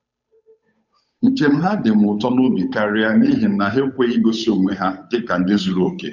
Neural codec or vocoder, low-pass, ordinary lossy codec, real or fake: codec, 16 kHz, 8 kbps, FunCodec, trained on Chinese and English, 25 frames a second; 7.2 kHz; none; fake